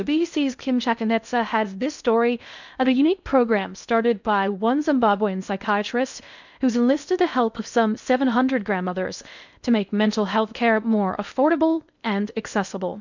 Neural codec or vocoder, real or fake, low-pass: codec, 16 kHz in and 24 kHz out, 0.6 kbps, FocalCodec, streaming, 2048 codes; fake; 7.2 kHz